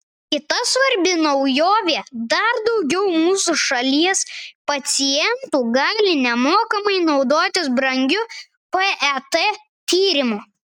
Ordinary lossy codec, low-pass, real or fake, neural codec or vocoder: MP3, 96 kbps; 19.8 kHz; real; none